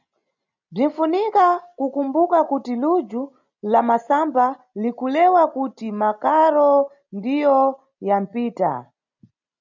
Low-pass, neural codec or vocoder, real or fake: 7.2 kHz; none; real